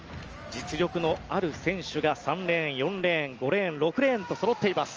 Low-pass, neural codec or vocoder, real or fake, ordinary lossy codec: 7.2 kHz; none; real; Opus, 24 kbps